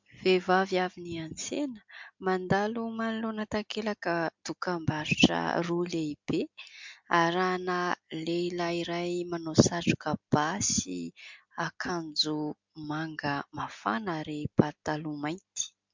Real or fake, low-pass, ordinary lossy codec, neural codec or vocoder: real; 7.2 kHz; MP3, 64 kbps; none